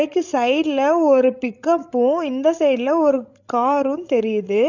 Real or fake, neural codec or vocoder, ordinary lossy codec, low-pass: fake; codec, 16 kHz, 16 kbps, FreqCodec, larger model; none; 7.2 kHz